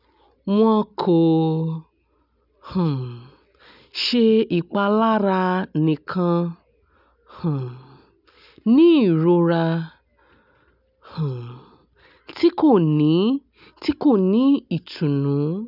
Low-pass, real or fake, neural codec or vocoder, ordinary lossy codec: 5.4 kHz; real; none; none